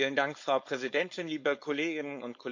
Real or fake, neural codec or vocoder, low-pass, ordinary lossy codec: fake; codec, 16 kHz, 4.8 kbps, FACodec; 7.2 kHz; MP3, 48 kbps